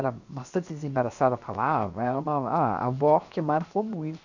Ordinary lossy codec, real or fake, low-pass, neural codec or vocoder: none; fake; 7.2 kHz; codec, 16 kHz, 0.7 kbps, FocalCodec